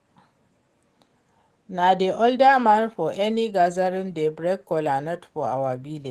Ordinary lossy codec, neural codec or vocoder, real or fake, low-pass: Opus, 24 kbps; codec, 44.1 kHz, 7.8 kbps, DAC; fake; 14.4 kHz